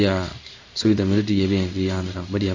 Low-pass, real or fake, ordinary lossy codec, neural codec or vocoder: 7.2 kHz; fake; AAC, 48 kbps; codec, 16 kHz in and 24 kHz out, 1 kbps, XY-Tokenizer